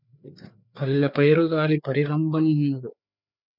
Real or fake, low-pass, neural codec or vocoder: fake; 5.4 kHz; codec, 16 kHz, 4 kbps, FreqCodec, larger model